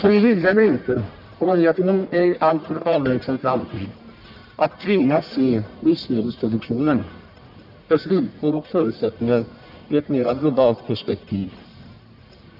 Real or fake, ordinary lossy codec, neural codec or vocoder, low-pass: fake; none; codec, 44.1 kHz, 1.7 kbps, Pupu-Codec; 5.4 kHz